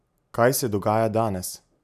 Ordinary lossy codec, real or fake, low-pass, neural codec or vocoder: none; real; 14.4 kHz; none